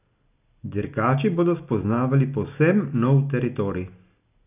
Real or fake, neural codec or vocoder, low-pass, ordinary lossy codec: real; none; 3.6 kHz; none